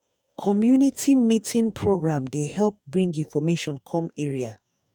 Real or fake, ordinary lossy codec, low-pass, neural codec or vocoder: fake; none; 19.8 kHz; codec, 44.1 kHz, 2.6 kbps, DAC